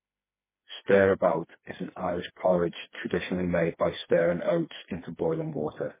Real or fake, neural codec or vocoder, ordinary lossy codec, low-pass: fake; codec, 16 kHz, 2 kbps, FreqCodec, smaller model; MP3, 16 kbps; 3.6 kHz